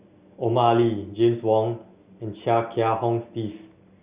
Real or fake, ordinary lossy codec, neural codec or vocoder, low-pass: real; Opus, 64 kbps; none; 3.6 kHz